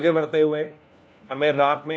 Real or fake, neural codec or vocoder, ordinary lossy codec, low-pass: fake; codec, 16 kHz, 1 kbps, FunCodec, trained on LibriTTS, 50 frames a second; none; none